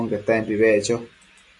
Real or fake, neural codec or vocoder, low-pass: real; none; 10.8 kHz